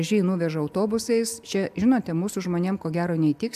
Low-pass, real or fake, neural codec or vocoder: 14.4 kHz; real; none